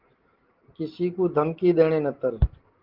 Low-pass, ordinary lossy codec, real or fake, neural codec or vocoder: 5.4 kHz; Opus, 16 kbps; real; none